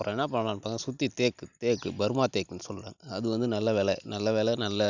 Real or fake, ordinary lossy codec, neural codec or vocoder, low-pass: real; none; none; 7.2 kHz